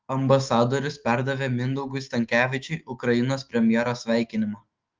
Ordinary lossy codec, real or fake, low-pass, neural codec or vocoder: Opus, 32 kbps; fake; 7.2 kHz; autoencoder, 48 kHz, 128 numbers a frame, DAC-VAE, trained on Japanese speech